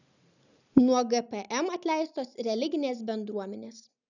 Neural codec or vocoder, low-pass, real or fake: none; 7.2 kHz; real